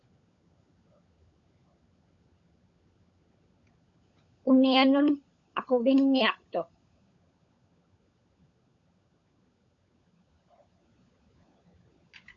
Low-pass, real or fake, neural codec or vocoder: 7.2 kHz; fake; codec, 16 kHz, 16 kbps, FunCodec, trained on LibriTTS, 50 frames a second